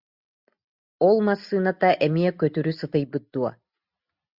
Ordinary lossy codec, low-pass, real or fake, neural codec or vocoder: Opus, 64 kbps; 5.4 kHz; real; none